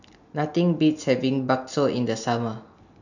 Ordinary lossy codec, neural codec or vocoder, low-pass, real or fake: none; none; 7.2 kHz; real